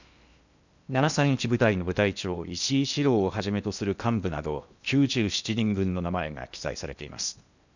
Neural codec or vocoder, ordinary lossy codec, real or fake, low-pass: codec, 16 kHz in and 24 kHz out, 0.8 kbps, FocalCodec, streaming, 65536 codes; none; fake; 7.2 kHz